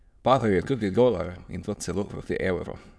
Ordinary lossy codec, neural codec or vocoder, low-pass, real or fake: none; autoencoder, 22.05 kHz, a latent of 192 numbers a frame, VITS, trained on many speakers; none; fake